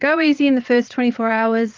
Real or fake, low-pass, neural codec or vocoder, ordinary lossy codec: fake; 7.2 kHz; vocoder, 22.05 kHz, 80 mel bands, Vocos; Opus, 32 kbps